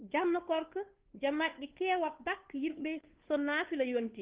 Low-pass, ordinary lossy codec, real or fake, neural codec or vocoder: 3.6 kHz; Opus, 16 kbps; fake; codec, 16 kHz, 2 kbps, FunCodec, trained on Chinese and English, 25 frames a second